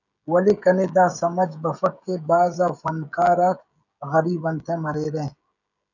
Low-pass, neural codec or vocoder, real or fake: 7.2 kHz; codec, 16 kHz, 16 kbps, FreqCodec, smaller model; fake